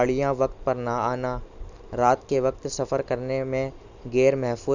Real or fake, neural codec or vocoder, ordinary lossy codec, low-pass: real; none; none; 7.2 kHz